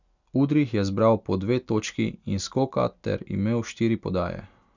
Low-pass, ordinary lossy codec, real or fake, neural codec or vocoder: 7.2 kHz; none; real; none